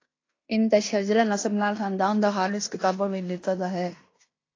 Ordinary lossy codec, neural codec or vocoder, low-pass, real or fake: AAC, 32 kbps; codec, 16 kHz in and 24 kHz out, 0.9 kbps, LongCat-Audio-Codec, fine tuned four codebook decoder; 7.2 kHz; fake